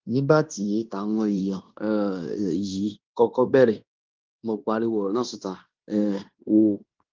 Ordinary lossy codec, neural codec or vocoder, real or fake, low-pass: Opus, 32 kbps; codec, 16 kHz in and 24 kHz out, 0.9 kbps, LongCat-Audio-Codec, fine tuned four codebook decoder; fake; 7.2 kHz